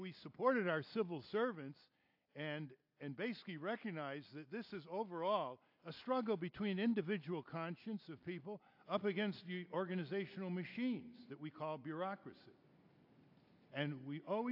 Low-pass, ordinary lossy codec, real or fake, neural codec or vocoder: 5.4 kHz; AAC, 48 kbps; real; none